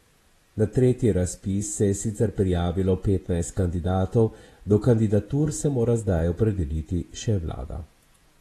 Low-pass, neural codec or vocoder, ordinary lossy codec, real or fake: 19.8 kHz; vocoder, 48 kHz, 128 mel bands, Vocos; AAC, 32 kbps; fake